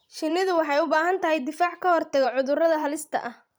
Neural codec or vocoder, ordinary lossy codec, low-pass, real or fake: vocoder, 44.1 kHz, 128 mel bands every 512 samples, BigVGAN v2; none; none; fake